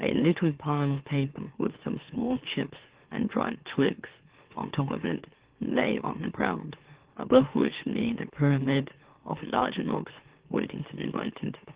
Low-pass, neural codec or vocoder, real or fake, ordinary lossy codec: 3.6 kHz; autoencoder, 44.1 kHz, a latent of 192 numbers a frame, MeloTTS; fake; Opus, 16 kbps